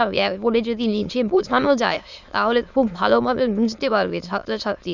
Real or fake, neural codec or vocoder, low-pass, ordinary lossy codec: fake; autoencoder, 22.05 kHz, a latent of 192 numbers a frame, VITS, trained on many speakers; 7.2 kHz; none